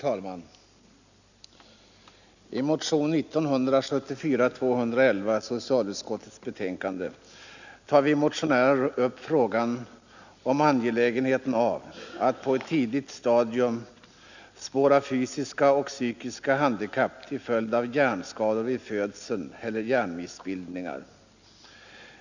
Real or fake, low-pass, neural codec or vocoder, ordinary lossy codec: real; 7.2 kHz; none; none